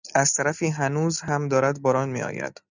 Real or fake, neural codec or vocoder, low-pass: real; none; 7.2 kHz